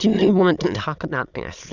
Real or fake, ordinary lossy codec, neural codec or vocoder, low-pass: fake; Opus, 64 kbps; autoencoder, 22.05 kHz, a latent of 192 numbers a frame, VITS, trained on many speakers; 7.2 kHz